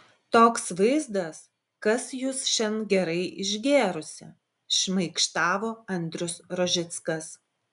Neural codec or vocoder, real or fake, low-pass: none; real; 10.8 kHz